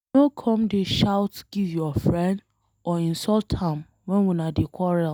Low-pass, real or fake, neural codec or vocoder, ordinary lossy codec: none; real; none; none